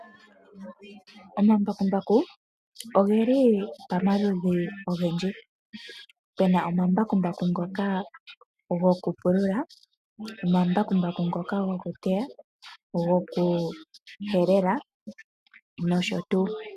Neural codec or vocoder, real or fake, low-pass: none; real; 14.4 kHz